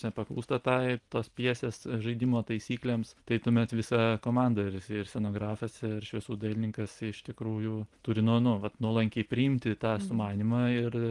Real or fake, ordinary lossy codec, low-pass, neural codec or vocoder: real; Opus, 24 kbps; 10.8 kHz; none